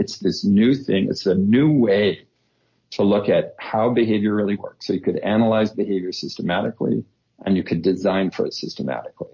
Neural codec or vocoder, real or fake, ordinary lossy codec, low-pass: none; real; MP3, 32 kbps; 7.2 kHz